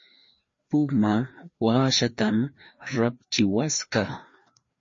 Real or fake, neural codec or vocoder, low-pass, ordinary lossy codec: fake; codec, 16 kHz, 2 kbps, FreqCodec, larger model; 7.2 kHz; MP3, 32 kbps